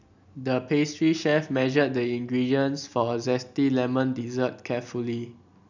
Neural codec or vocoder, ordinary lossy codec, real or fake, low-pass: none; none; real; 7.2 kHz